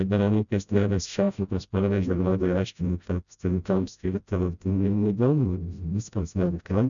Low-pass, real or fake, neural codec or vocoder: 7.2 kHz; fake; codec, 16 kHz, 0.5 kbps, FreqCodec, smaller model